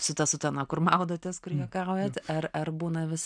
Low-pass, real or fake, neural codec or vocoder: 9.9 kHz; real; none